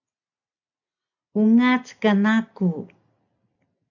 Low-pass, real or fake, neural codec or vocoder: 7.2 kHz; real; none